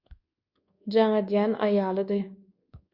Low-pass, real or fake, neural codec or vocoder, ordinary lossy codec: 5.4 kHz; fake; codec, 24 kHz, 1.2 kbps, DualCodec; Opus, 64 kbps